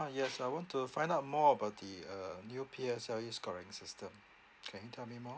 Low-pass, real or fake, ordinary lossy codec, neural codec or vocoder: none; real; none; none